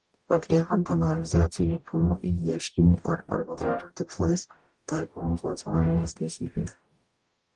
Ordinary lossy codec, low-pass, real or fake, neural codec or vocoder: Opus, 32 kbps; 10.8 kHz; fake; codec, 44.1 kHz, 0.9 kbps, DAC